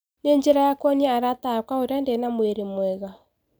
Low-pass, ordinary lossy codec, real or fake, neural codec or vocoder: none; none; real; none